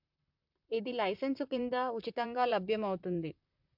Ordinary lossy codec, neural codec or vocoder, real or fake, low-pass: MP3, 48 kbps; vocoder, 44.1 kHz, 128 mel bands, Pupu-Vocoder; fake; 5.4 kHz